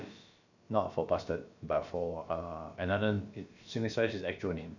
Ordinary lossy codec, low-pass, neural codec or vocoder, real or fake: none; 7.2 kHz; codec, 16 kHz, about 1 kbps, DyCAST, with the encoder's durations; fake